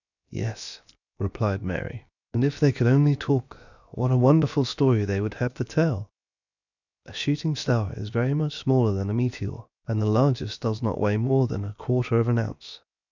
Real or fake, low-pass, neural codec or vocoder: fake; 7.2 kHz; codec, 16 kHz, 0.7 kbps, FocalCodec